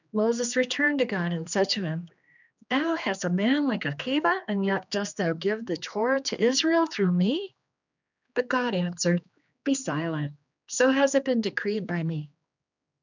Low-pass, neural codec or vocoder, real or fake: 7.2 kHz; codec, 16 kHz, 2 kbps, X-Codec, HuBERT features, trained on general audio; fake